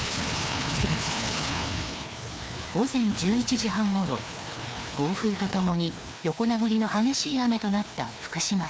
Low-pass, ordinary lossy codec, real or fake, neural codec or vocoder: none; none; fake; codec, 16 kHz, 2 kbps, FreqCodec, larger model